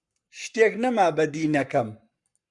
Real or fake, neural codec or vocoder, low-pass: fake; codec, 44.1 kHz, 7.8 kbps, Pupu-Codec; 10.8 kHz